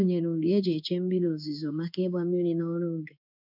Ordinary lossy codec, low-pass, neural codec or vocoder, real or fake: none; 5.4 kHz; codec, 16 kHz in and 24 kHz out, 1 kbps, XY-Tokenizer; fake